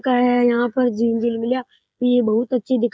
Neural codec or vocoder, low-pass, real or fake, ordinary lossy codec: codec, 16 kHz, 16 kbps, FreqCodec, smaller model; none; fake; none